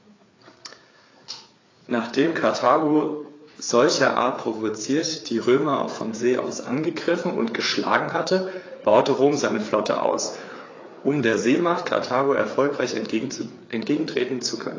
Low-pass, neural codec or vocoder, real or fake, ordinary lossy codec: 7.2 kHz; codec, 16 kHz, 4 kbps, FreqCodec, larger model; fake; AAC, 32 kbps